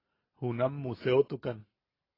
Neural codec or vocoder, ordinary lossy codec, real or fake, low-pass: none; AAC, 24 kbps; real; 5.4 kHz